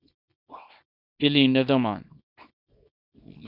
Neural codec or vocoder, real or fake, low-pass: codec, 24 kHz, 0.9 kbps, WavTokenizer, small release; fake; 5.4 kHz